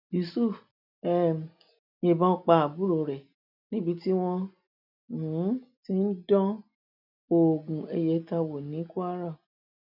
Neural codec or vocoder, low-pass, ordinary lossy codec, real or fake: none; 5.4 kHz; none; real